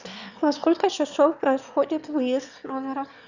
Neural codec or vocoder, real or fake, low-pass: autoencoder, 22.05 kHz, a latent of 192 numbers a frame, VITS, trained on one speaker; fake; 7.2 kHz